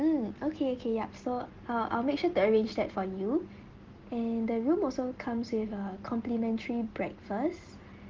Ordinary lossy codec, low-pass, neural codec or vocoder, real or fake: Opus, 16 kbps; 7.2 kHz; none; real